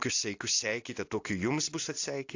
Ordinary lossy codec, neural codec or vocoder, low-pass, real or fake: AAC, 48 kbps; none; 7.2 kHz; real